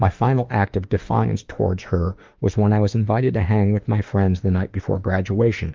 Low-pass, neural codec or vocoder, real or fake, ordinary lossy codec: 7.2 kHz; autoencoder, 48 kHz, 32 numbers a frame, DAC-VAE, trained on Japanese speech; fake; Opus, 32 kbps